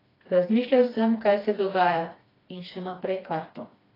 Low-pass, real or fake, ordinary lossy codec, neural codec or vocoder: 5.4 kHz; fake; AAC, 24 kbps; codec, 16 kHz, 2 kbps, FreqCodec, smaller model